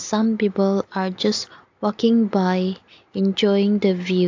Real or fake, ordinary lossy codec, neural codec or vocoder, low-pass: real; AAC, 48 kbps; none; 7.2 kHz